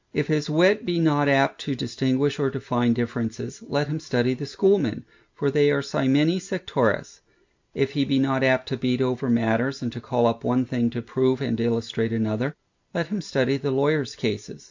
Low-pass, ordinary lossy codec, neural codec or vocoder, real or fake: 7.2 kHz; MP3, 64 kbps; none; real